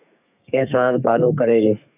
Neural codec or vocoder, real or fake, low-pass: codec, 32 kHz, 1.9 kbps, SNAC; fake; 3.6 kHz